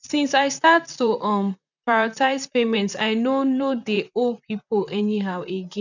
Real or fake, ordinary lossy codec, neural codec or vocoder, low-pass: fake; none; vocoder, 44.1 kHz, 128 mel bands, Pupu-Vocoder; 7.2 kHz